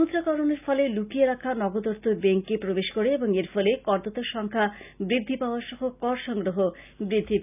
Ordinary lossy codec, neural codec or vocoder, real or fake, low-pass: none; none; real; 3.6 kHz